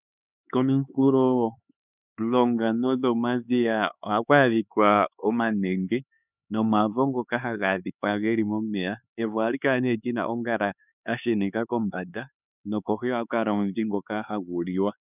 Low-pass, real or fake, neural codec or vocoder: 3.6 kHz; fake; codec, 16 kHz, 4 kbps, X-Codec, HuBERT features, trained on LibriSpeech